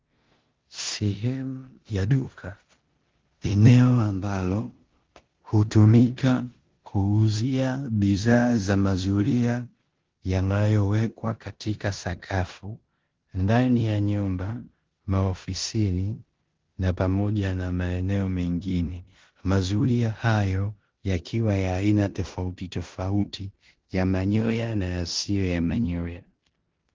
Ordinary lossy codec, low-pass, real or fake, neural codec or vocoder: Opus, 16 kbps; 7.2 kHz; fake; codec, 16 kHz in and 24 kHz out, 0.9 kbps, LongCat-Audio-Codec, four codebook decoder